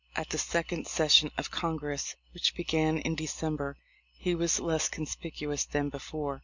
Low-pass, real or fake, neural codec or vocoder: 7.2 kHz; real; none